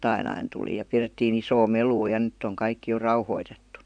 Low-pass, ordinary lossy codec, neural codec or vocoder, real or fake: 9.9 kHz; none; vocoder, 44.1 kHz, 128 mel bands, Pupu-Vocoder; fake